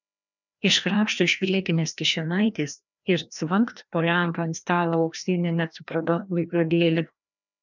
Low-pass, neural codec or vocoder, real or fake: 7.2 kHz; codec, 16 kHz, 1 kbps, FreqCodec, larger model; fake